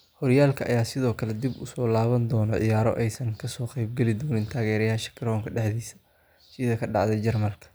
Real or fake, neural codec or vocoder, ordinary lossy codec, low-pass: real; none; none; none